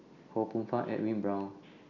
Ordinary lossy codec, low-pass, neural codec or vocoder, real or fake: none; 7.2 kHz; none; real